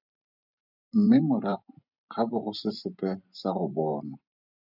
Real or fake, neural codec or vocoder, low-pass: fake; vocoder, 44.1 kHz, 128 mel bands every 512 samples, BigVGAN v2; 5.4 kHz